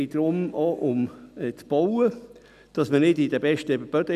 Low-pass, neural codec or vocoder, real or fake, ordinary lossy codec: 14.4 kHz; none; real; none